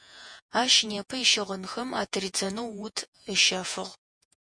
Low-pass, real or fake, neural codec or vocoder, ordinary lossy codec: 9.9 kHz; fake; vocoder, 48 kHz, 128 mel bands, Vocos; MP3, 64 kbps